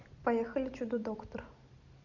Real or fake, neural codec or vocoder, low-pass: fake; vocoder, 44.1 kHz, 128 mel bands every 256 samples, BigVGAN v2; 7.2 kHz